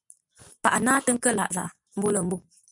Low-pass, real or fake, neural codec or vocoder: 10.8 kHz; real; none